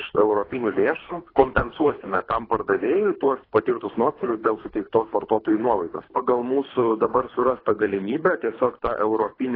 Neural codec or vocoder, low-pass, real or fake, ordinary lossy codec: codec, 24 kHz, 3 kbps, HILCodec; 5.4 kHz; fake; AAC, 24 kbps